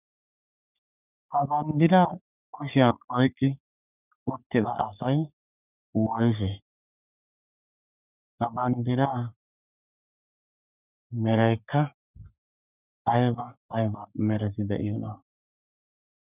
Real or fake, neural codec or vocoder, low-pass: fake; codec, 44.1 kHz, 3.4 kbps, Pupu-Codec; 3.6 kHz